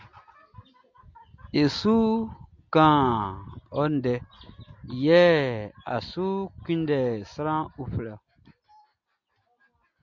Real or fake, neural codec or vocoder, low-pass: real; none; 7.2 kHz